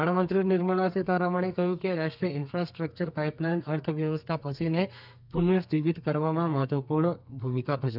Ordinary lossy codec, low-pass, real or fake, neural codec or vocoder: none; 5.4 kHz; fake; codec, 32 kHz, 1.9 kbps, SNAC